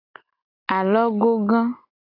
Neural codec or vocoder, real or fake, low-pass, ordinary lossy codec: none; real; 5.4 kHz; AAC, 32 kbps